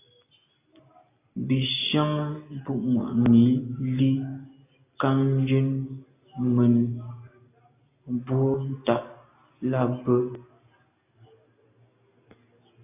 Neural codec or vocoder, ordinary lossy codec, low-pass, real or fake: none; AAC, 24 kbps; 3.6 kHz; real